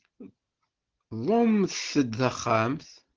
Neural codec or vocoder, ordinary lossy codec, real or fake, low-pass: none; Opus, 16 kbps; real; 7.2 kHz